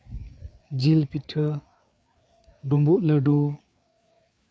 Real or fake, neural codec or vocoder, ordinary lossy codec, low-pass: fake; codec, 16 kHz, 4 kbps, FreqCodec, larger model; none; none